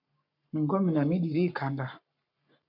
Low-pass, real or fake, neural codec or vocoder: 5.4 kHz; fake; codec, 44.1 kHz, 7.8 kbps, Pupu-Codec